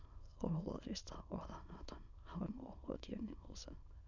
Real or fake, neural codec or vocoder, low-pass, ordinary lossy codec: fake; autoencoder, 22.05 kHz, a latent of 192 numbers a frame, VITS, trained on many speakers; 7.2 kHz; none